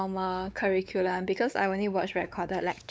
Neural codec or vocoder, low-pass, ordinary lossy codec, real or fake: codec, 16 kHz, 4 kbps, X-Codec, WavLM features, trained on Multilingual LibriSpeech; none; none; fake